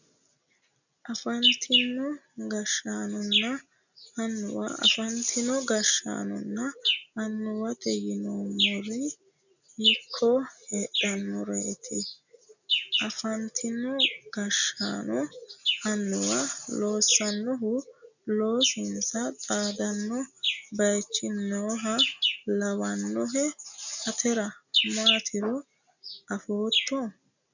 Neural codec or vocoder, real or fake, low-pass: none; real; 7.2 kHz